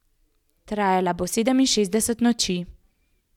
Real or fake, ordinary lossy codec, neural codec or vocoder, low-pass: real; none; none; 19.8 kHz